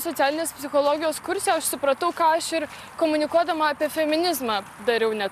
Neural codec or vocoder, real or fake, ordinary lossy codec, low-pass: none; real; MP3, 96 kbps; 14.4 kHz